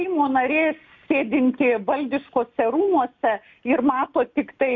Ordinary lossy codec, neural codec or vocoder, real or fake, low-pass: MP3, 48 kbps; none; real; 7.2 kHz